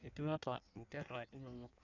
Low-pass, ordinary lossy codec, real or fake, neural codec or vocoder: 7.2 kHz; none; fake; codec, 16 kHz in and 24 kHz out, 1.1 kbps, FireRedTTS-2 codec